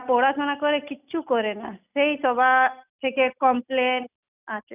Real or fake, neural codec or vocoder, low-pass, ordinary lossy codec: real; none; 3.6 kHz; none